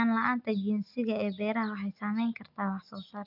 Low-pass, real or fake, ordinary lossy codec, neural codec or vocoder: 5.4 kHz; real; MP3, 48 kbps; none